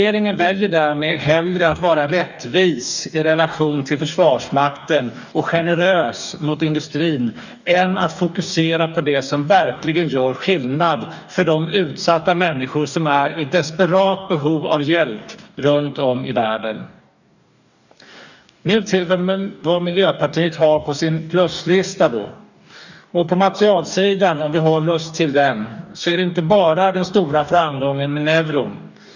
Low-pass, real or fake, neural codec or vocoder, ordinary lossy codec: 7.2 kHz; fake; codec, 44.1 kHz, 2.6 kbps, DAC; none